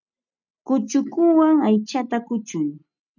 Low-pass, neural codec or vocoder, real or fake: 7.2 kHz; none; real